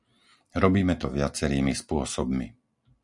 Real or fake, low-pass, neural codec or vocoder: real; 10.8 kHz; none